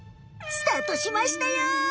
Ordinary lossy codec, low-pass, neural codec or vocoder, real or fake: none; none; none; real